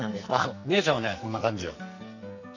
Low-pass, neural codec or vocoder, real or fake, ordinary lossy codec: 7.2 kHz; codec, 44.1 kHz, 2.6 kbps, SNAC; fake; none